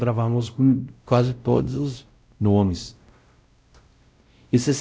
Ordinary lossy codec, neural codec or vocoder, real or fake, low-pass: none; codec, 16 kHz, 0.5 kbps, X-Codec, WavLM features, trained on Multilingual LibriSpeech; fake; none